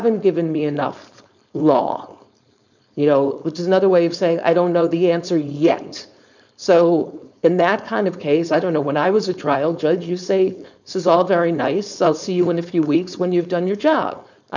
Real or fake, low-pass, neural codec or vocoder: fake; 7.2 kHz; codec, 16 kHz, 4.8 kbps, FACodec